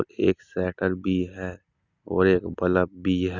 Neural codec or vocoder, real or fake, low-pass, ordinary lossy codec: none; real; 7.2 kHz; none